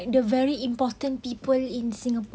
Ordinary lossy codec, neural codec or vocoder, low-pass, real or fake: none; none; none; real